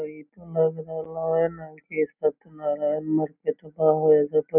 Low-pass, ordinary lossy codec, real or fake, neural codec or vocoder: 3.6 kHz; none; real; none